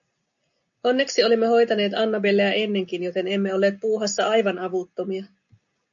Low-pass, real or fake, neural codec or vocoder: 7.2 kHz; real; none